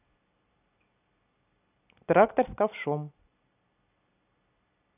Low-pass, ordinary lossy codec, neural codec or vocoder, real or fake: 3.6 kHz; none; none; real